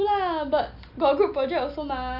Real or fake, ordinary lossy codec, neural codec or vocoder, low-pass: real; AAC, 48 kbps; none; 5.4 kHz